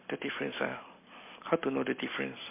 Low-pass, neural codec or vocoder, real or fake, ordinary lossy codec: 3.6 kHz; none; real; MP3, 24 kbps